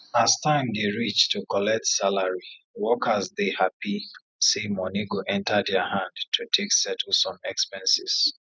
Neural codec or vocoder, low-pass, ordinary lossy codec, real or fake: none; none; none; real